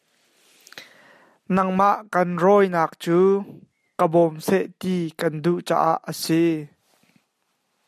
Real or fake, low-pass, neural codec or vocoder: real; 14.4 kHz; none